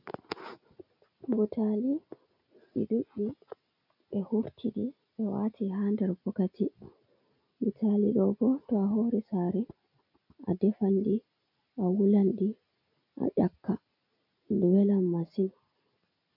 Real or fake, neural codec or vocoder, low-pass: real; none; 5.4 kHz